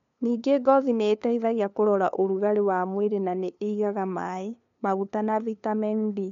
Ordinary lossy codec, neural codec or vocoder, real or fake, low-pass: MP3, 64 kbps; codec, 16 kHz, 2 kbps, FunCodec, trained on LibriTTS, 25 frames a second; fake; 7.2 kHz